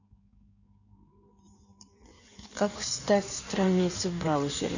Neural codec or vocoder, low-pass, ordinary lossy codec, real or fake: codec, 16 kHz in and 24 kHz out, 1.1 kbps, FireRedTTS-2 codec; 7.2 kHz; none; fake